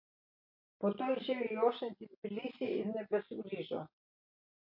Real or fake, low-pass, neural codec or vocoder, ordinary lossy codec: real; 5.4 kHz; none; MP3, 32 kbps